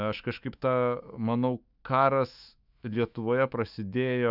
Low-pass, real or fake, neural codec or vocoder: 5.4 kHz; fake; autoencoder, 48 kHz, 128 numbers a frame, DAC-VAE, trained on Japanese speech